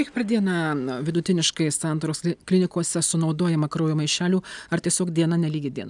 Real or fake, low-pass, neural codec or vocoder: real; 10.8 kHz; none